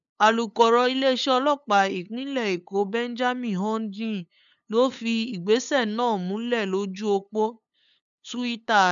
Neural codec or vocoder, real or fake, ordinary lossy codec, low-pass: codec, 16 kHz, 8 kbps, FunCodec, trained on LibriTTS, 25 frames a second; fake; none; 7.2 kHz